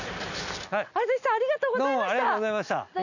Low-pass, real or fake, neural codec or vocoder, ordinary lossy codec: 7.2 kHz; real; none; none